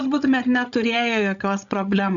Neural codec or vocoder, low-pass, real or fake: codec, 16 kHz, 16 kbps, FreqCodec, larger model; 7.2 kHz; fake